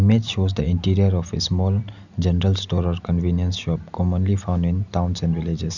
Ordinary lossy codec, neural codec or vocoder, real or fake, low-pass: none; none; real; 7.2 kHz